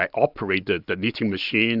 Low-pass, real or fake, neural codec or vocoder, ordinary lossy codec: 5.4 kHz; real; none; AAC, 48 kbps